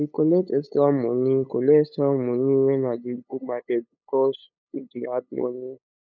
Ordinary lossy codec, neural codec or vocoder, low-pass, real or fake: none; codec, 16 kHz, 8 kbps, FunCodec, trained on LibriTTS, 25 frames a second; 7.2 kHz; fake